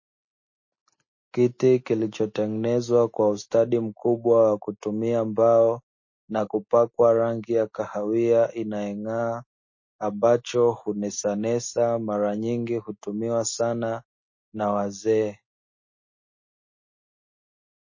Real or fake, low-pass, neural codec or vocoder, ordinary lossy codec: real; 7.2 kHz; none; MP3, 32 kbps